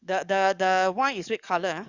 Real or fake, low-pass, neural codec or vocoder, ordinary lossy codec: fake; 7.2 kHz; codec, 44.1 kHz, 7.8 kbps, DAC; Opus, 64 kbps